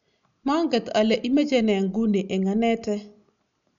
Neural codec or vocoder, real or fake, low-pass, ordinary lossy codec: none; real; 7.2 kHz; none